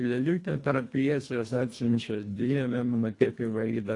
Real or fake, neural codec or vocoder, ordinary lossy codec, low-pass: fake; codec, 24 kHz, 1.5 kbps, HILCodec; AAC, 48 kbps; 10.8 kHz